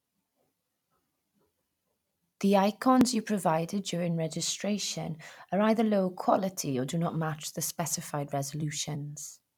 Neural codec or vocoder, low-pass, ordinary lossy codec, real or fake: vocoder, 44.1 kHz, 128 mel bands every 256 samples, BigVGAN v2; 19.8 kHz; none; fake